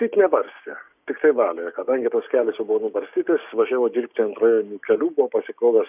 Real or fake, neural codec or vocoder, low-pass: fake; codec, 16 kHz, 6 kbps, DAC; 3.6 kHz